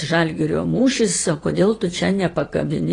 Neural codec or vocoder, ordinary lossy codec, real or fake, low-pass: vocoder, 22.05 kHz, 80 mel bands, Vocos; AAC, 32 kbps; fake; 9.9 kHz